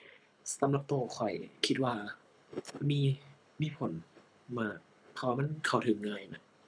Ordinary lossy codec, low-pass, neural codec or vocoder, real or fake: none; 9.9 kHz; codec, 24 kHz, 6 kbps, HILCodec; fake